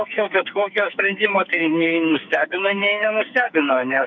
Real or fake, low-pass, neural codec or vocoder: fake; 7.2 kHz; codec, 44.1 kHz, 2.6 kbps, SNAC